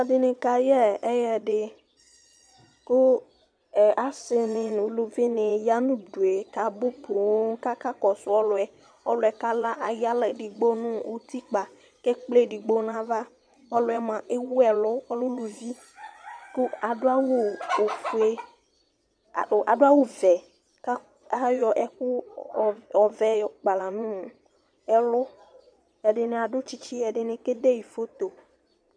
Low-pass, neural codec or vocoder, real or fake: 9.9 kHz; vocoder, 22.05 kHz, 80 mel bands, Vocos; fake